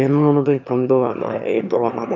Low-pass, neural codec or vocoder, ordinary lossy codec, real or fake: 7.2 kHz; autoencoder, 22.05 kHz, a latent of 192 numbers a frame, VITS, trained on one speaker; none; fake